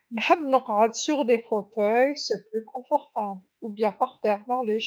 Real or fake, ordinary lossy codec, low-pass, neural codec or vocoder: fake; none; none; autoencoder, 48 kHz, 32 numbers a frame, DAC-VAE, trained on Japanese speech